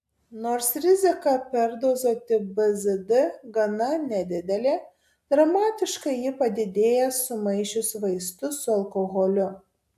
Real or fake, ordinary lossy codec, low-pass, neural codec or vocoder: real; AAC, 96 kbps; 14.4 kHz; none